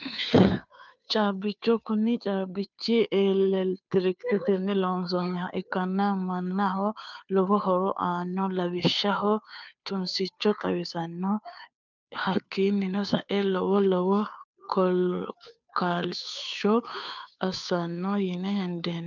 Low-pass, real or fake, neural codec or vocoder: 7.2 kHz; fake; codec, 16 kHz, 2 kbps, FunCodec, trained on Chinese and English, 25 frames a second